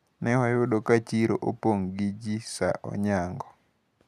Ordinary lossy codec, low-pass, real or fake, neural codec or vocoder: none; 14.4 kHz; real; none